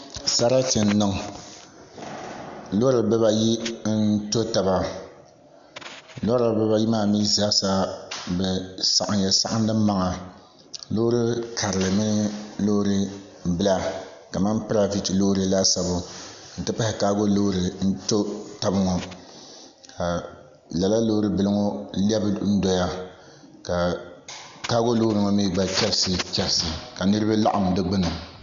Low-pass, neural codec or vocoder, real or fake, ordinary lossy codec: 7.2 kHz; none; real; MP3, 64 kbps